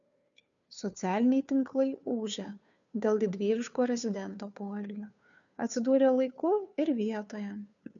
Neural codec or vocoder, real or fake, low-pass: codec, 16 kHz, 2 kbps, FunCodec, trained on Chinese and English, 25 frames a second; fake; 7.2 kHz